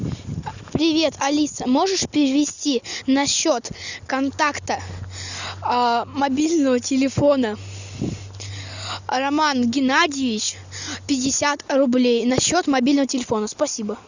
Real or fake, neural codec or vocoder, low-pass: real; none; 7.2 kHz